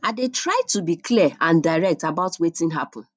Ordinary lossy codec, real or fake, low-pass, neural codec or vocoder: none; real; none; none